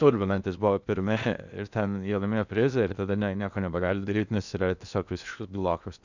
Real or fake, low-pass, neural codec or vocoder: fake; 7.2 kHz; codec, 16 kHz in and 24 kHz out, 0.8 kbps, FocalCodec, streaming, 65536 codes